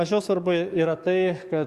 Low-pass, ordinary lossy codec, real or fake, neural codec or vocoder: 14.4 kHz; Opus, 64 kbps; fake; codec, 44.1 kHz, 7.8 kbps, DAC